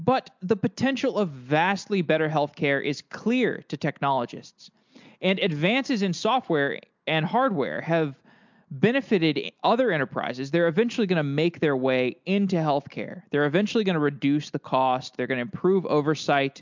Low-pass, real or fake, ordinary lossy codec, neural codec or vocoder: 7.2 kHz; real; MP3, 64 kbps; none